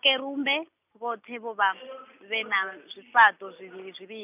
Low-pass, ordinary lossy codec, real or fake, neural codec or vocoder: 3.6 kHz; none; real; none